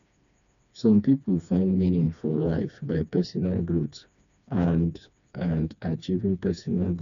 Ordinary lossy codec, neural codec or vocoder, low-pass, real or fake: none; codec, 16 kHz, 2 kbps, FreqCodec, smaller model; 7.2 kHz; fake